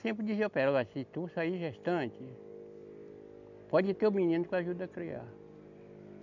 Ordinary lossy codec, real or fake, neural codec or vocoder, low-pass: none; real; none; 7.2 kHz